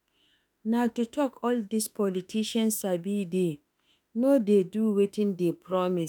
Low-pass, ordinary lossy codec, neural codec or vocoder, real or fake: none; none; autoencoder, 48 kHz, 32 numbers a frame, DAC-VAE, trained on Japanese speech; fake